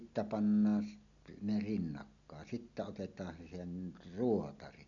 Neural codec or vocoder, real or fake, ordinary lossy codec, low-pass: none; real; none; 7.2 kHz